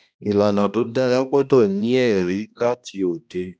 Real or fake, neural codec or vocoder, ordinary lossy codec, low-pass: fake; codec, 16 kHz, 1 kbps, X-Codec, HuBERT features, trained on balanced general audio; none; none